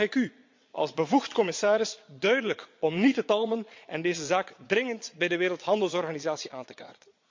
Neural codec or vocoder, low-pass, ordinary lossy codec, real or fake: vocoder, 22.05 kHz, 80 mel bands, Vocos; 7.2 kHz; MP3, 48 kbps; fake